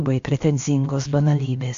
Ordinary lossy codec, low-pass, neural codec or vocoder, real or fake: AAC, 48 kbps; 7.2 kHz; codec, 16 kHz, about 1 kbps, DyCAST, with the encoder's durations; fake